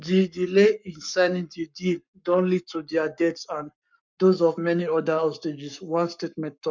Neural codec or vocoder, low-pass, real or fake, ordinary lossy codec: codec, 44.1 kHz, 7.8 kbps, Pupu-Codec; 7.2 kHz; fake; MP3, 64 kbps